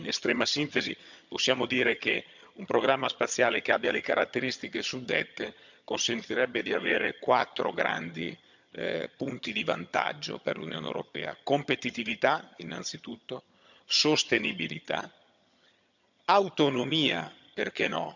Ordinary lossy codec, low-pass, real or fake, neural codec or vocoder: none; 7.2 kHz; fake; vocoder, 22.05 kHz, 80 mel bands, HiFi-GAN